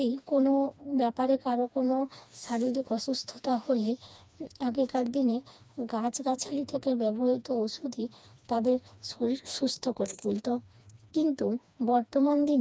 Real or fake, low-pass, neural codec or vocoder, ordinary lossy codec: fake; none; codec, 16 kHz, 2 kbps, FreqCodec, smaller model; none